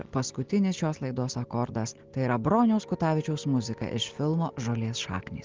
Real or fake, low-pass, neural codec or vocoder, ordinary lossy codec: real; 7.2 kHz; none; Opus, 16 kbps